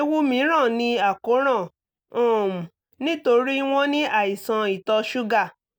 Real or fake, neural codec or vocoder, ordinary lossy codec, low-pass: real; none; none; none